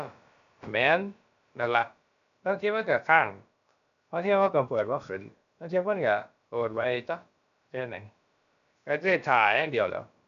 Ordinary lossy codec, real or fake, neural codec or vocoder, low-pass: none; fake; codec, 16 kHz, about 1 kbps, DyCAST, with the encoder's durations; 7.2 kHz